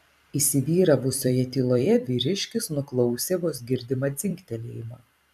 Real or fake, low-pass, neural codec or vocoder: fake; 14.4 kHz; vocoder, 44.1 kHz, 128 mel bands every 256 samples, BigVGAN v2